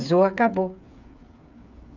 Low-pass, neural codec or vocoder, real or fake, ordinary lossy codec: 7.2 kHz; codec, 16 kHz, 8 kbps, FreqCodec, smaller model; fake; none